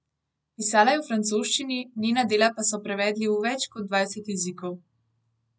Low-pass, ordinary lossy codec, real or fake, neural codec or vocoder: none; none; real; none